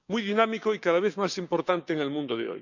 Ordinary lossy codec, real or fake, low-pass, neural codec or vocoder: none; fake; 7.2 kHz; codec, 16 kHz, 6 kbps, DAC